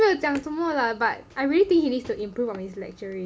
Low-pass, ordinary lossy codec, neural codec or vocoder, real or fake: none; none; none; real